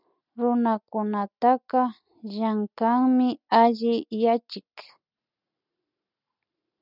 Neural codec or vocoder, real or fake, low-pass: none; real; 5.4 kHz